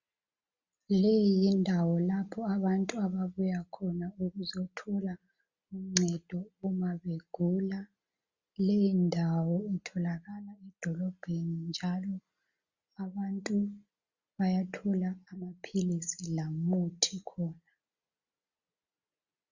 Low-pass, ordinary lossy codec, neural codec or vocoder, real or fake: 7.2 kHz; Opus, 64 kbps; none; real